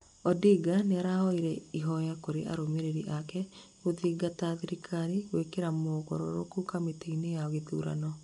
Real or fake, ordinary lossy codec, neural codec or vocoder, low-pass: real; MP3, 64 kbps; none; 10.8 kHz